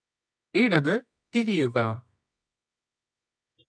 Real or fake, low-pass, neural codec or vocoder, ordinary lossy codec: fake; 9.9 kHz; codec, 24 kHz, 0.9 kbps, WavTokenizer, medium music audio release; none